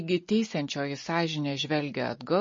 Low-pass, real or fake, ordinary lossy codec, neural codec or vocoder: 7.2 kHz; real; MP3, 32 kbps; none